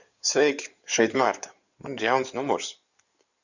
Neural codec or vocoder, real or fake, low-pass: codec, 16 kHz in and 24 kHz out, 2.2 kbps, FireRedTTS-2 codec; fake; 7.2 kHz